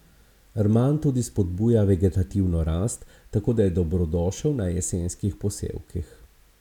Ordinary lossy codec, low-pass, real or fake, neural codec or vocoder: none; 19.8 kHz; real; none